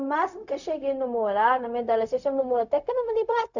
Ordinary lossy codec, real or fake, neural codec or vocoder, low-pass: none; fake; codec, 16 kHz, 0.4 kbps, LongCat-Audio-Codec; 7.2 kHz